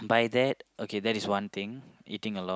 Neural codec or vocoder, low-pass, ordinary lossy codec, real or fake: none; none; none; real